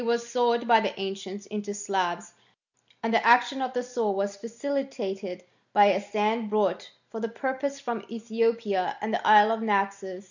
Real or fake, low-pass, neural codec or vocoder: real; 7.2 kHz; none